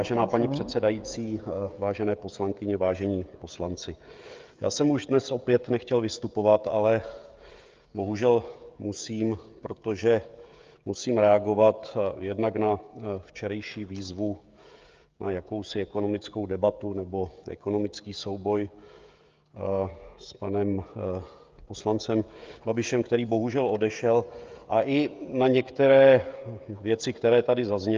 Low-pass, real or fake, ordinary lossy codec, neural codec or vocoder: 7.2 kHz; fake; Opus, 32 kbps; codec, 16 kHz, 16 kbps, FreqCodec, smaller model